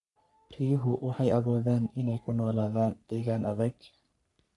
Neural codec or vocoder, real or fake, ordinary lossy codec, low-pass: codec, 44.1 kHz, 3.4 kbps, Pupu-Codec; fake; none; 10.8 kHz